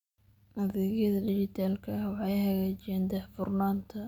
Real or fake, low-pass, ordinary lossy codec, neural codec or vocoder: real; 19.8 kHz; none; none